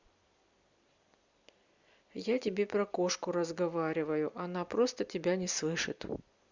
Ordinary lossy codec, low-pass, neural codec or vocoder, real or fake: Opus, 64 kbps; 7.2 kHz; vocoder, 44.1 kHz, 128 mel bands every 256 samples, BigVGAN v2; fake